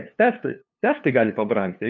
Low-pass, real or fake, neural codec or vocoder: 7.2 kHz; fake; codec, 16 kHz, 2 kbps, FunCodec, trained on LibriTTS, 25 frames a second